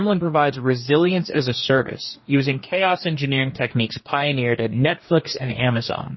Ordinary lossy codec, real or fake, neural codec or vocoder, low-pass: MP3, 24 kbps; fake; codec, 44.1 kHz, 2.6 kbps, DAC; 7.2 kHz